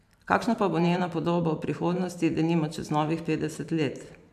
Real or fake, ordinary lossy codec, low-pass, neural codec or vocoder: fake; none; 14.4 kHz; vocoder, 44.1 kHz, 128 mel bands every 512 samples, BigVGAN v2